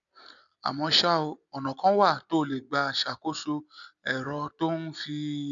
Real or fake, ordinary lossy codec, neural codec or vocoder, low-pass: real; none; none; 7.2 kHz